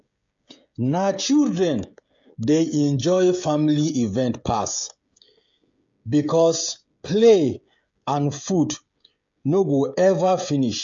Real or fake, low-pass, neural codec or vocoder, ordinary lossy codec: fake; 7.2 kHz; codec, 16 kHz, 16 kbps, FreqCodec, smaller model; AAC, 64 kbps